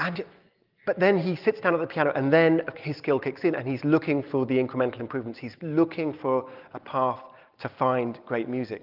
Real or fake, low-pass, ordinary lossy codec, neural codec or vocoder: real; 5.4 kHz; Opus, 24 kbps; none